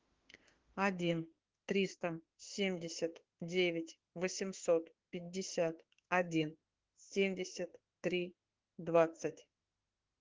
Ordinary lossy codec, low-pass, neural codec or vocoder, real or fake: Opus, 16 kbps; 7.2 kHz; autoencoder, 48 kHz, 32 numbers a frame, DAC-VAE, trained on Japanese speech; fake